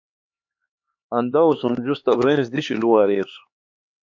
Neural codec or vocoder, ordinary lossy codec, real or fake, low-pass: codec, 16 kHz, 4 kbps, X-Codec, HuBERT features, trained on LibriSpeech; MP3, 48 kbps; fake; 7.2 kHz